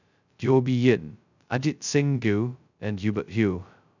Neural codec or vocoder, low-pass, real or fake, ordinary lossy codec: codec, 16 kHz, 0.2 kbps, FocalCodec; 7.2 kHz; fake; none